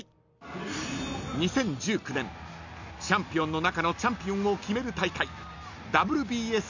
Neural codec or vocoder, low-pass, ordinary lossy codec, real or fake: none; 7.2 kHz; none; real